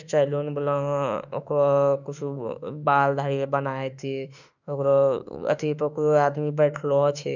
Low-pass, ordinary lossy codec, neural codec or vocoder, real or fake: 7.2 kHz; none; autoencoder, 48 kHz, 32 numbers a frame, DAC-VAE, trained on Japanese speech; fake